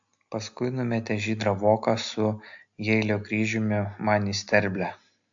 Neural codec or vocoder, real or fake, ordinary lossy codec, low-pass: none; real; AAC, 64 kbps; 7.2 kHz